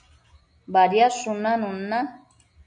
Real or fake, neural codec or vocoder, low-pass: real; none; 9.9 kHz